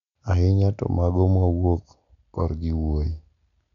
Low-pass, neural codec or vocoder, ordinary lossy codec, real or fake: 7.2 kHz; none; none; real